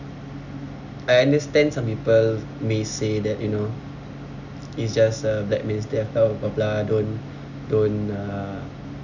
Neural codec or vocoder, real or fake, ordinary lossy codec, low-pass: none; real; none; 7.2 kHz